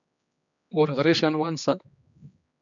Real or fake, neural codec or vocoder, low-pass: fake; codec, 16 kHz, 2 kbps, X-Codec, HuBERT features, trained on general audio; 7.2 kHz